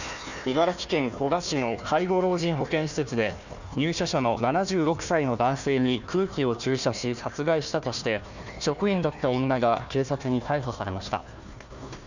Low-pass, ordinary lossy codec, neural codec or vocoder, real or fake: 7.2 kHz; none; codec, 16 kHz, 1 kbps, FunCodec, trained on Chinese and English, 50 frames a second; fake